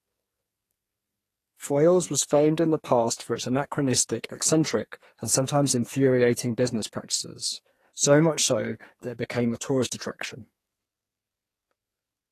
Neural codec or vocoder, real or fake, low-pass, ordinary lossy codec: codec, 44.1 kHz, 2.6 kbps, SNAC; fake; 14.4 kHz; AAC, 48 kbps